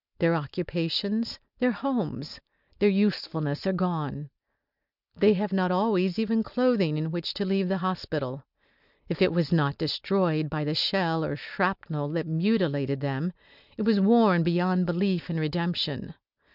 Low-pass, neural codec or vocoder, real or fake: 5.4 kHz; none; real